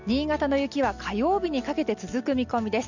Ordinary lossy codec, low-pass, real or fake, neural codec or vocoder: none; 7.2 kHz; real; none